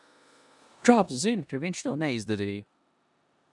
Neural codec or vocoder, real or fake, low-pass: codec, 16 kHz in and 24 kHz out, 0.9 kbps, LongCat-Audio-Codec, four codebook decoder; fake; 10.8 kHz